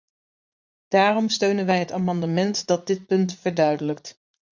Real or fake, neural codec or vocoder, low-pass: fake; vocoder, 44.1 kHz, 80 mel bands, Vocos; 7.2 kHz